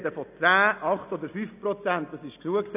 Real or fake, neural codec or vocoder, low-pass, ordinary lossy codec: real; none; 3.6 kHz; none